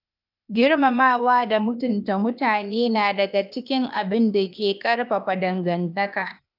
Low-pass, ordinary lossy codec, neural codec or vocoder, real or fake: 5.4 kHz; none; codec, 16 kHz, 0.8 kbps, ZipCodec; fake